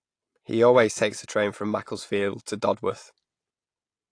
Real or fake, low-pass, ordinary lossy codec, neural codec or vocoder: real; 9.9 kHz; AAC, 48 kbps; none